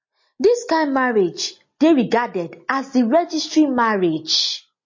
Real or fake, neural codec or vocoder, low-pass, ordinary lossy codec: real; none; 7.2 kHz; MP3, 32 kbps